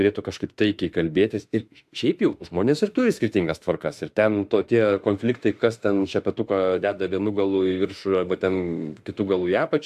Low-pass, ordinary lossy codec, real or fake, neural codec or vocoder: 14.4 kHz; AAC, 96 kbps; fake; autoencoder, 48 kHz, 32 numbers a frame, DAC-VAE, trained on Japanese speech